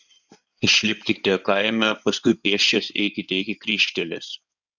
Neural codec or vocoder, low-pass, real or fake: codec, 16 kHz in and 24 kHz out, 2.2 kbps, FireRedTTS-2 codec; 7.2 kHz; fake